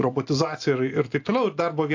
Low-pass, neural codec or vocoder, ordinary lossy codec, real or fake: 7.2 kHz; none; AAC, 48 kbps; real